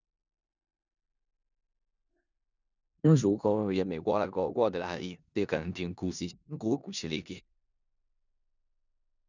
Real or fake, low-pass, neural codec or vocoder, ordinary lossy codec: fake; 7.2 kHz; codec, 16 kHz in and 24 kHz out, 0.4 kbps, LongCat-Audio-Codec, four codebook decoder; none